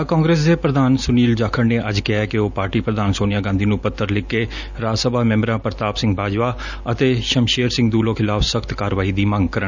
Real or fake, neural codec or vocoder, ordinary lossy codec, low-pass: real; none; none; 7.2 kHz